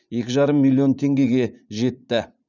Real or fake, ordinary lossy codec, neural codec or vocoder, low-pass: fake; none; vocoder, 44.1 kHz, 80 mel bands, Vocos; 7.2 kHz